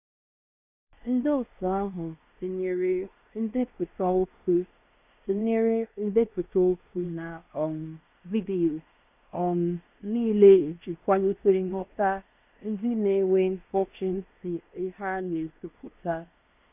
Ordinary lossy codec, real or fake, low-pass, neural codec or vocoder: none; fake; 3.6 kHz; codec, 16 kHz in and 24 kHz out, 0.9 kbps, LongCat-Audio-Codec, fine tuned four codebook decoder